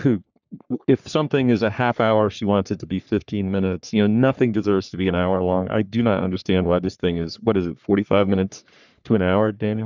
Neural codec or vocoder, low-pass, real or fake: codec, 44.1 kHz, 3.4 kbps, Pupu-Codec; 7.2 kHz; fake